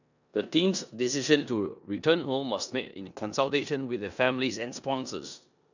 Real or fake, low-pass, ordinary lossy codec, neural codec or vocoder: fake; 7.2 kHz; none; codec, 16 kHz in and 24 kHz out, 0.9 kbps, LongCat-Audio-Codec, four codebook decoder